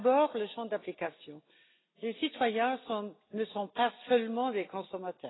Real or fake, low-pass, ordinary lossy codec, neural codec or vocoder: real; 7.2 kHz; AAC, 16 kbps; none